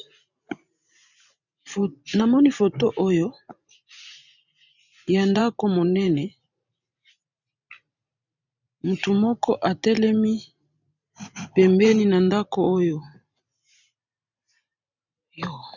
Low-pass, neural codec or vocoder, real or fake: 7.2 kHz; vocoder, 44.1 kHz, 128 mel bands every 512 samples, BigVGAN v2; fake